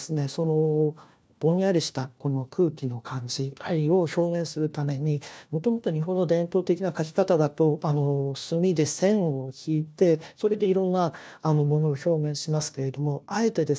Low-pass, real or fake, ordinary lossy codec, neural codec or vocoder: none; fake; none; codec, 16 kHz, 1 kbps, FunCodec, trained on LibriTTS, 50 frames a second